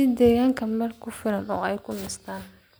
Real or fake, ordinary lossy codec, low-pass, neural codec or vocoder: real; none; none; none